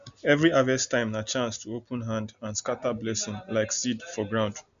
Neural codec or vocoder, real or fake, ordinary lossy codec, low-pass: none; real; none; 7.2 kHz